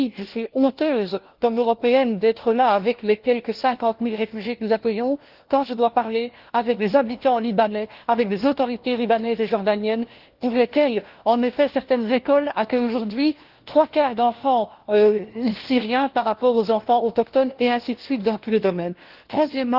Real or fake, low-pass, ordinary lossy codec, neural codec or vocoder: fake; 5.4 kHz; Opus, 16 kbps; codec, 16 kHz, 1 kbps, FunCodec, trained on LibriTTS, 50 frames a second